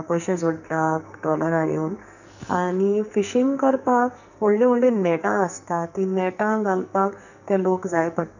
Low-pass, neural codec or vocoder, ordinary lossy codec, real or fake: 7.2 kHz; codec, 44.1 kHz, 2.6 kbps, SNAC; none; fake